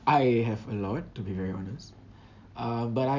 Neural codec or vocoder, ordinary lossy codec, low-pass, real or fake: none; none; 7.2 kHz; real